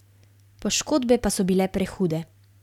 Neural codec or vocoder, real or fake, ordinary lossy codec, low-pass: none; real; none; 19.8 kHz